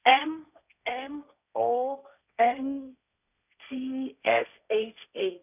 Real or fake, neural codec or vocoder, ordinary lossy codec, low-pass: fake; codec, 16 kHz, 1.1 kbps, Voila-Tokenizer; none; 3.6 kHz